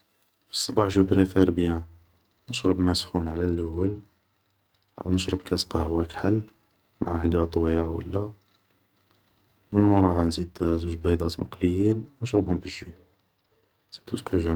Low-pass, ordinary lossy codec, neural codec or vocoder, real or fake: none; none; codec, 44.1 kHz, 2.6 kbps, SNAC; fake